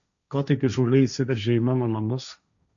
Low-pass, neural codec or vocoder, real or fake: 7.2 kHz; codec, 16 kHz, 1.1 kbps, Voila-Tokenizer; fake